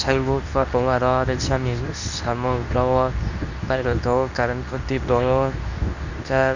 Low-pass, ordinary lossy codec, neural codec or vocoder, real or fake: 7.2 kHz; none; codec, 24 kHz, 0.9 kbps, WavTokenizer, medium speech release version 2; fake